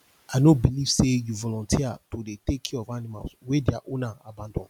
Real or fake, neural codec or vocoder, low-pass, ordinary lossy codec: real; none; 19.8 kHz; MP3, 96 kbps